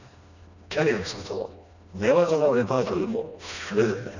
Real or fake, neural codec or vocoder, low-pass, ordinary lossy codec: fake; codec, 16 kHz, 1 kbps, FreqCodec, smaller model; 7.2 kHz; none